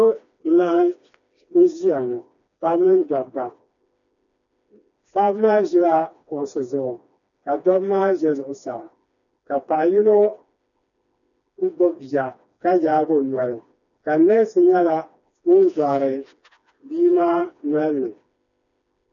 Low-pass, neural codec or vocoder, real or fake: 7.2 kHz; codec, 16 kHz, 2 kbps, FreqCodec, smaller model; fake